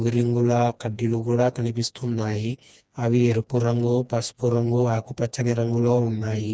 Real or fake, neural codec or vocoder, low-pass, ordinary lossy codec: fake; codec, 16 kHz, 2 kbps, FreqCodec, smaller model; none; none